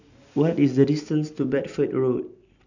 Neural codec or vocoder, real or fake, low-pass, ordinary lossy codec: none; real; 7.2 kHz; none